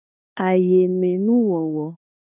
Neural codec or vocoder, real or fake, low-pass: codec, 16 kHz in and 24 kHz out, 0.9 kbps, LongCat-Audio-Codec, four codebook decoder; fake; 3.6 kHz